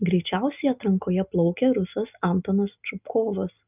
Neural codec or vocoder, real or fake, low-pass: none; real; 3.6 kHz